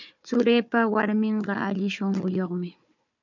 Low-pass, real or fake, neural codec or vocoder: 7.2 kHz; fake; codec, 16 kHz, 4 kbps, FunCodec, trained on Chinese and English, 50 frames a second